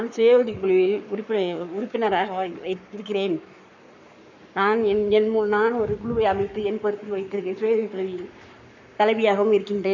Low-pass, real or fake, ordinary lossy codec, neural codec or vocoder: 7.2 kHz; fake; none; codec, 44.1 kHz, 7.8 kbps, Pupu-Codec